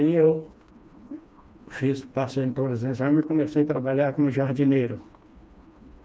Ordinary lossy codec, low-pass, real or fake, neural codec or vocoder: none; none; fake; codec, 16 kHz, 2 kbps, FreqCodec, smaller model